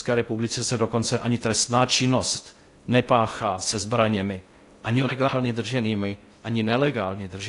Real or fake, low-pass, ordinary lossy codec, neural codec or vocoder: fake; 10.8 kHz; AAC, 48 kbps; codec, 16 kHz in and 24 kHz out, 0.6 kbps, FocalCodec, streaming, 2048 codes